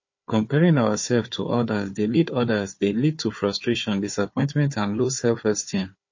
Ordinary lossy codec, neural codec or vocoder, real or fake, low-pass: MP3, 32 kbps; codec, 16 kHz, 4 kbps, FunCodec, trained on Chinese and English, 50 frames a second; fake; 7.2 kHz